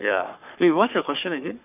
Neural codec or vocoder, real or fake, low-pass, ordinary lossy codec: codec, 44.1 kHz, 3.4 kbps, Pupu-Codec; fake; 3.6 kHz; none